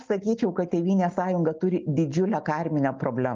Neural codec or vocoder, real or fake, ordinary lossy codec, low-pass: none; real; Opus, 24 kbps; 7.2 kHz